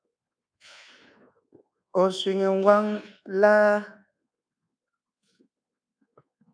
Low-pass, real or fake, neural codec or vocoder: 9.9 kHz; fake; codec, 24 kHz, 1.2 kbps, DualCodec